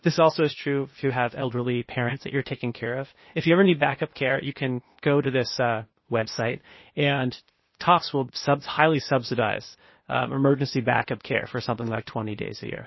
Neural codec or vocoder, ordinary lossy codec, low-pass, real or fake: codec, 16 kHz, 0.8 kbps, ZipCodec; MP3, 24 kbps; 7.2 kHz; fake